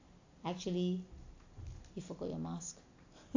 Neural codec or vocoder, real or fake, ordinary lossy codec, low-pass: none; real; AAC, 48 kbps; 7.2 kHz